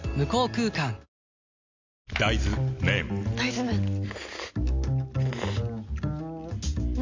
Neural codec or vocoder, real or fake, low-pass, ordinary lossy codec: none; real; 7.2 kHz; MP3, 64 kbps